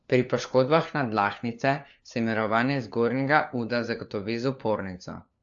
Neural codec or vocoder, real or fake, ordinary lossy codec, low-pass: codec, 16 kHz, 4 kbps, FunCodec, trained on LibriTTS, 50 frames a second; fake; AAC, 64 kbps; 7.2 kHz